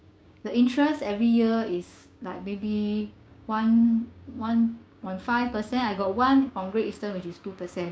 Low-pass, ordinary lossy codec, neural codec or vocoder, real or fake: none; none; codec, 16 kHz, 6 kbps, DAC; fake